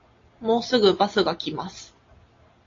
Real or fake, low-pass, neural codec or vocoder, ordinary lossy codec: real; 7.2 kHz; none; AAC, 32 kbps